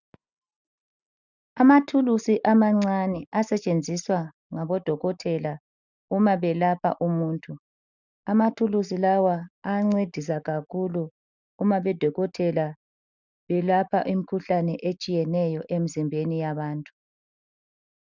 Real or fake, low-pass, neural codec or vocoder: real; 7.2 kHz; none